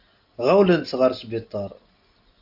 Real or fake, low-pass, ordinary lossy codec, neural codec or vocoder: real; 5.4 kHz; AAC, 48 kbps; none